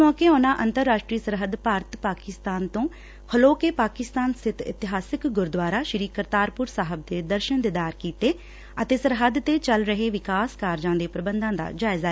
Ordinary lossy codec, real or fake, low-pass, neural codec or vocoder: none; real; none; none